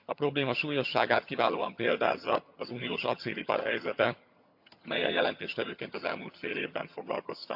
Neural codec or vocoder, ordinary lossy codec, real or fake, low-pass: vocoder, 22.05 kHz, 80 mel bands, HiFi-GAN; AAC, 48 kbps; fake; 5.4 kHz